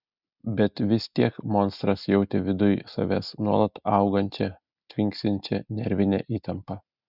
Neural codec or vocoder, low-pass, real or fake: none; 5.4 kHz; real